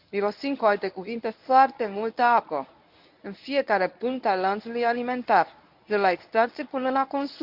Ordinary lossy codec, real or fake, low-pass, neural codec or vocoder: none; fake; 5.4 kHz; codec, 24 kHz, 0.9 kbps, WavTokenizer, medium speech release version 1